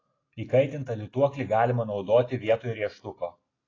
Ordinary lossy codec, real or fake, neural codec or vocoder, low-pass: AAC, 32 kbps; real; none; 7.2 kHz